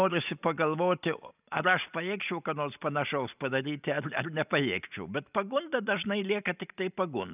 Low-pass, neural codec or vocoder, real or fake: 3.6 kHz; none; real